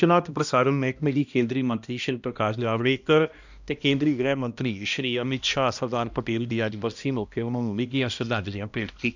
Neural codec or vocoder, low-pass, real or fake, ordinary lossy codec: codec, 16 kHz, 1 kbps, X-Codec, HuBERT features, trained on balanced general audio; 7.2 kHz; fake; none